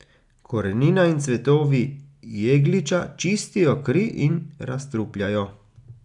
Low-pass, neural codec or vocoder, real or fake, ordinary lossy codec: 10.8 kHz; none; real; none